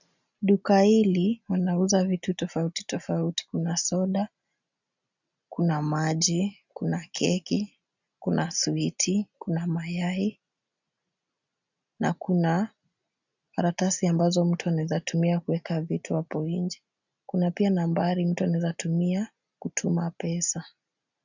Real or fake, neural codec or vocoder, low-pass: real; none; 7.2 kHz